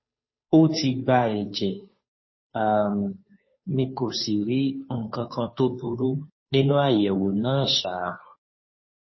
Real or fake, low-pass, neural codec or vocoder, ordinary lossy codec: fake; 7.2 kHz; codec, 16 kHz, 2 kbps, FunCodec, trained on Chinese and English, 25 frames a second; MP3, 24 kbps